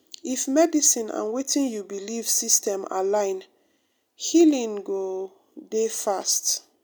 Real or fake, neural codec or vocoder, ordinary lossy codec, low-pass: real; none; none; none